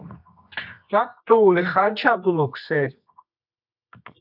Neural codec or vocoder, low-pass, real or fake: codec, 24 kHz, 0.9 kbps, WavTokenizer, medium music audio release; 5.4 kHz; fake